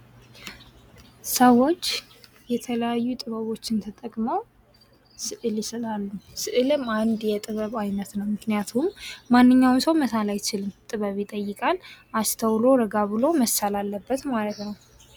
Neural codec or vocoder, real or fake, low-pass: none; real; 19.8 kHz